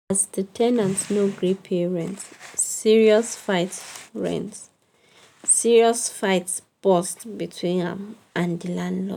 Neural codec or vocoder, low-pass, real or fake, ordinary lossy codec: none; 19.8 kHz; real; none